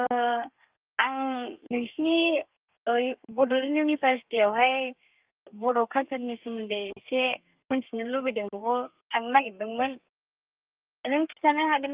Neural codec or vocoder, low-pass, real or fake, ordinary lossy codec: codec, 32 kHz, 1.9 kbps, SNAC; 3.6 kHz; fake; Opus, 32 kbps